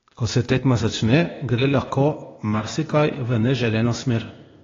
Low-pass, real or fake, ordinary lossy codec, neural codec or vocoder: 7.2 kHz; fake; AAC, 32 kbps; codec, 16 kHz, 0.8 kbps, ZipCodec